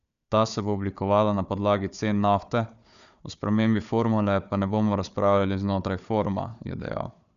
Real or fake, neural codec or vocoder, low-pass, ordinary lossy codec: fake; codec, 16 kHz, 4 kbps, FunCodec, trained on Chinese and English, 50 frames a second; 7.2 kHz; none